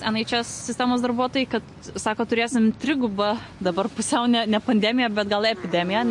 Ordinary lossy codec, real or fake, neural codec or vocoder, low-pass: MP3, 48 kbps; real; none; 10.8 kHz